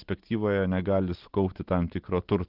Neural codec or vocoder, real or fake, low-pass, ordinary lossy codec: none; real; 5.4 kHz; Opus, 16 kbps